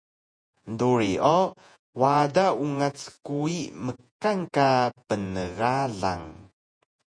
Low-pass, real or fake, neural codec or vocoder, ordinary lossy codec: 9.9 kHz; fake; vocoder, 48 kHz, 128 mel bands, Vocos; MP3, 64 kbps